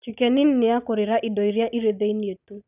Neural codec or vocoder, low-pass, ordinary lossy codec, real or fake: none; 3.6 kHz; none; real